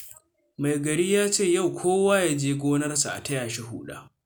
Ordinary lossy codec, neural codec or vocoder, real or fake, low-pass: none; none; real; none